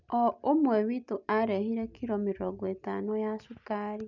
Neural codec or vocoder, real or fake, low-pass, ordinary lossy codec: none; real; 7.2 kHz; none